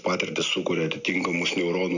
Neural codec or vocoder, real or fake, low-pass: none; real; 7.2 kHz